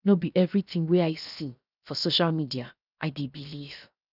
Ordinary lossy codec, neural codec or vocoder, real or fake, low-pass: none; codec, 16 kHz, 0.7 kbps, FocalCodec; fake; 5.4 kHz